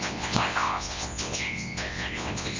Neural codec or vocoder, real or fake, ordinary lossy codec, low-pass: codec, 24 kHz, 0.9 kbps, WavTokenizer, large speech release; fake; MP3, 48 kbps; 7.2 kHz